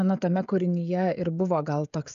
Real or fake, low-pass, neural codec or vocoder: fake; 7.2 kHz; codec, 16 kHz, 16 kbps, FreqCodec, smaller model